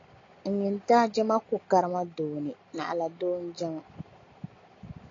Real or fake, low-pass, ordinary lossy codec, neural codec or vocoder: real; 7.2 kHz; AAC, 32 kbps; none